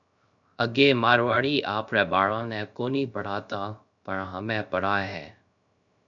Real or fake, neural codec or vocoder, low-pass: fake; codec, 16 kHz, 0.3 kbps, FocalCodec; 7.2 kHz